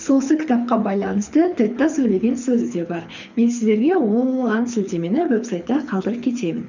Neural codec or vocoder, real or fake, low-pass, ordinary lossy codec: codec, 24 kHz, 6 kbps, HILCodec; fake; 7.2 kHz; none